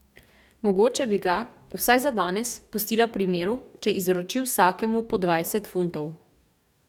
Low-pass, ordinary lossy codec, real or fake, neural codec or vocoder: 19.8 kHz; none; fake; codec, 44.1 kHz, 2.6 kbps, DAC